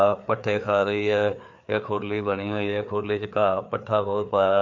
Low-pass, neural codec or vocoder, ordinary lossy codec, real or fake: 7.2 kHz; codec, 16 kHz, 4 kbps, FreqCodec, larger model; MP3, 48 kbps; fake